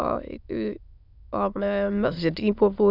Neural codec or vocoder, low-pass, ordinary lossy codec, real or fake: autoencoder, 22.05 kHz, a latent of 192 numbers a frame, VITS, trained on many speakers; 5.4 kHz; none; fake